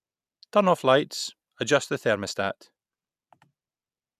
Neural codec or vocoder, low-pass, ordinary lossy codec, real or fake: none; 14.4 kHz; none; real